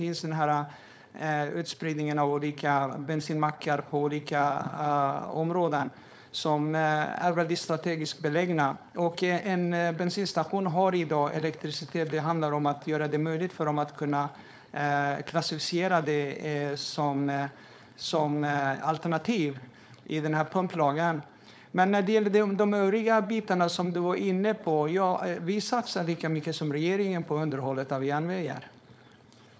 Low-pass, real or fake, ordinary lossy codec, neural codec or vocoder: none; fake; none; codec, 16 kHz, 4.8 kbps, FACodec